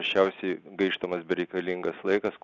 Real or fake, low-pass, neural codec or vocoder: real; 7.2 kHz; none